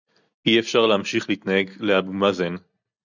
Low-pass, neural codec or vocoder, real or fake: 7.2 kHz; none; real